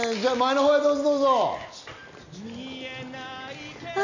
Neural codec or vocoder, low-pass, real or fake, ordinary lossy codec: none; 7.2 kHz; real; none